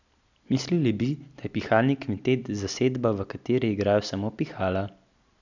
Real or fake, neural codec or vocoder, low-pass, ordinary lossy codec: real; none; 7.2 kHz; none